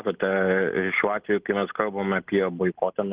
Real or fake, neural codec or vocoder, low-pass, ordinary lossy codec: real; none; 3.6 kHz; Opus, 16 kbps